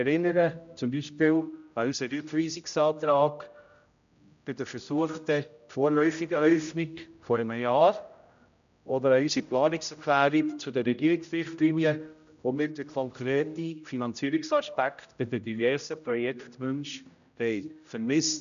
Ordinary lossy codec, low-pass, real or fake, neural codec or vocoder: none; 7.2 kHz; fake; codec, 16 kHz, 0.5 kbps, X-Codec, HuBERT features, trained on general audio